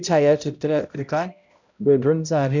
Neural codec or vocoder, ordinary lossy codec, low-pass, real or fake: codec, 16 kHz, 0.5 kbps, X-Codec, HuBERT features, trained on balanced general audio; none; 7.2 kHz; fake